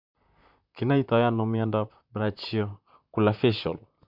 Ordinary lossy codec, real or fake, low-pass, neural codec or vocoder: none; fake; 5.4 kHz; vocoder, 44.1 kHz, 128 mel bands, Pupu-Vocoder